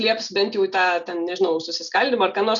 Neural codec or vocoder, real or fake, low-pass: none; real; 7.2 kHz